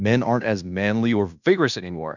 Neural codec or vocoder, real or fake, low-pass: codec, 16 kHz in and 24 kHz out, 0.9 kbps, LongCat-Audio-Codec, fine tuned four codebook decoder; fake; 7.2 kHz